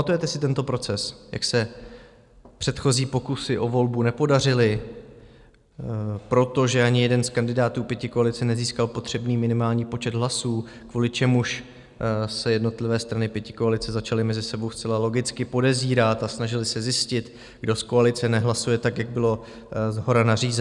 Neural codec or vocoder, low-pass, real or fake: none; 10.8 kHz; real